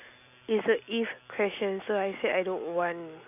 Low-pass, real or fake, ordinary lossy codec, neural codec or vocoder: 3.6 kHz; real; none; none